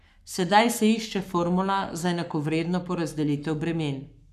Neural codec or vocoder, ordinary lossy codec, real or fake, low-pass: codec, 44.1 kHz, 7.8 kbps, Pupu-Codec; none; fake; 14.4 kHz